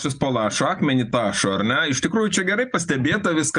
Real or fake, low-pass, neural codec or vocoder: real; 9.9 kHz; none